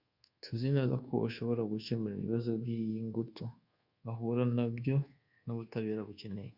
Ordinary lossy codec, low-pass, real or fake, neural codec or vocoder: Opus, 64 kbps; 5.4 kHz; fake; codec, 24 kHz, 1.2 kbps, DualCodec